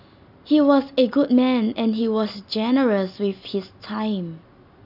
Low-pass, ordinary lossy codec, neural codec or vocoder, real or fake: 5.4 kHz; none; none; real